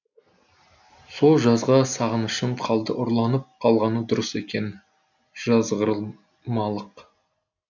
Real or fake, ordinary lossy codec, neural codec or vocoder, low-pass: real; none; none; 7.2 kHz